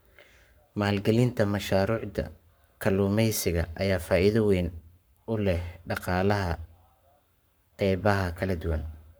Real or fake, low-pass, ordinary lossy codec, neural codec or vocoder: fake; none; none; codec, 44.1 kHz, 7.8 kbps, Pupu-Codec